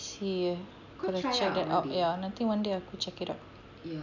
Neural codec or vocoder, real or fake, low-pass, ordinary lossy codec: none; real; 7.2 kHz; none